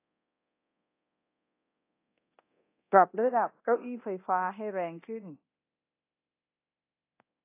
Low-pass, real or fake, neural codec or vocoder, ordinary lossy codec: 3.6 kHz; fake; codec, 24 kHz, 0.9 kbps, DualCodec; AAC, 24 kbps